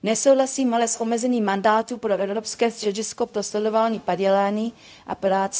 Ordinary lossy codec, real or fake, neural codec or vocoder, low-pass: none; fake; codec, 16 kHz, 0.4 kbps, LongCat-Audio-Codec; none